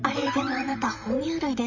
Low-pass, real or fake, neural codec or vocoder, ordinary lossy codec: 7.2 kHz; fake; vocoder, 44.1 kHz, 128 mel bands, Pupu-Vocoder; none